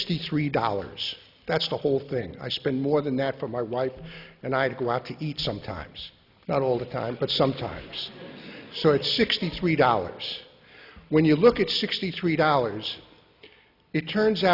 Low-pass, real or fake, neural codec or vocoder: 5.4 kHz; real; none